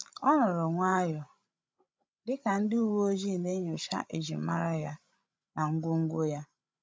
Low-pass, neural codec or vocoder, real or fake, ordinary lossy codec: none; codec, 16 kHz, 16 kbps, FreqCodec, larger model; fake; none